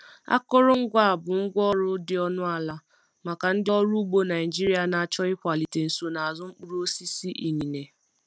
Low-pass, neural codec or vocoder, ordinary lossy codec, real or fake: none; none; none; real